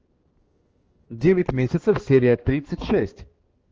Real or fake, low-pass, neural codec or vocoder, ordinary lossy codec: fake; 7.2 kHz; codec, 16 kHz, 2 kbps, X-Codec, HuBERT features, trained on balanced general audio; Opus, 16 kbps